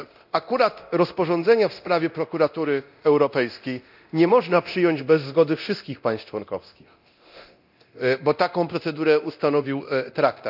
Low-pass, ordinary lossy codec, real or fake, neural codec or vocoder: 5.4 kHz; none; fake; codec, 24 kHz, 0.9 kbps, DualCodec